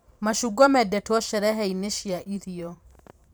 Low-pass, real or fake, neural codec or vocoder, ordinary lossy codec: none; fake; vocoder, 44.1 kHz, 128 mel bands every 512 samples, BigVGAN v2; none